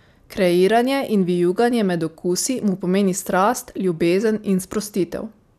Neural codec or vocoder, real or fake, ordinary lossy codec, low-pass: none; real; none; 14.4 kHz